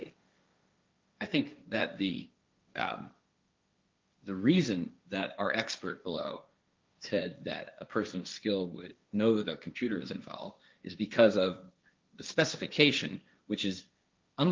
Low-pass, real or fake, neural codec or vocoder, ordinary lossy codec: 7.2 kHz; fake; codec, 16 kHz, 1.1 kbps, Voila-Tokenizer; Opus, 24 kbps